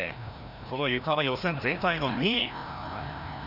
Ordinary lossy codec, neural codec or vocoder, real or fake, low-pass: none; codec, 16 kHz, 1 kbps, FreqCodec, larger model; fake; 5.4 kHz